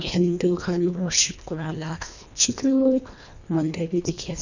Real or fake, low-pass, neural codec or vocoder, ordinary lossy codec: fake; 7.2 kHz; codec, 24 kHz, 1.5 kbps, HILCodec; none